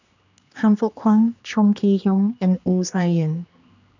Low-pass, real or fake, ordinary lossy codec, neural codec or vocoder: 7.2 kHz; fake; none; codec, 16 kHz, 2 kbps, X-Codec, HuBERT features, trained on general audio